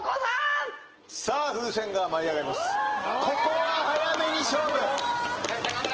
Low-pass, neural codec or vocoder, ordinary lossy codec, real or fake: 7.2 kHz; none; Opus, 16 kbps; real